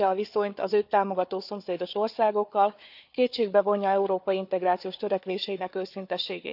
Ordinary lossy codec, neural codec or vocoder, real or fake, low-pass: none; codec, 16 kHz, 4 kbps, FunCodec, trained on Chinese and English, 50 frames a second; fake; 5.4 kHz